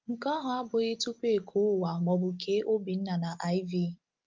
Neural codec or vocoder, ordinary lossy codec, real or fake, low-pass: none; Opus, 24 kbps; real; 7.2 kHz